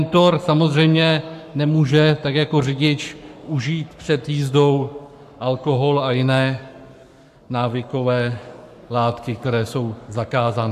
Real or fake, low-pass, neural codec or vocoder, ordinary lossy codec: fake; 14.4 kHz; codec, 44.1 kHz, 7.8 kbps, Pupu-Codec; AAC, 96 kbps